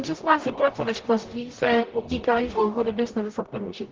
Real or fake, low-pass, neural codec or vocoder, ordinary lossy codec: fake; 7.2 kHz; codec, 44.1 kHz, 0.9 kbps, DAC; Opus, 16 kbps